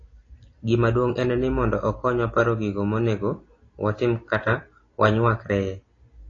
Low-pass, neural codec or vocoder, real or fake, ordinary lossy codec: 7.2 kHz; none; real; AAC, 32 kbps